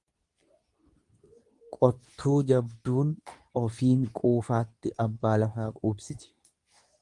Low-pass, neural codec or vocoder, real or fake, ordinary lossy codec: 10.8 kHz; codec, 24 kHz, 0.9 kbps, WavTokenizer, medium speech release version 2; fake; Opus, 32 kbps